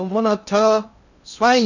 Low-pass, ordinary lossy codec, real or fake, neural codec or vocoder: 7.2 kHz; none; fake; codec, 16 kHz in and 24 kHz out, 0.6 kbps, FocalCodec, streaming, 2048 codes